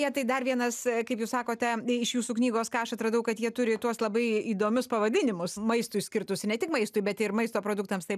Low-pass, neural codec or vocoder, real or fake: 14.4 kHz; none; real